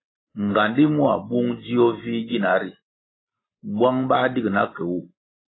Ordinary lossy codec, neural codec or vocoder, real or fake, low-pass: AAC, 16 kbps; none; real; 7.2 kHz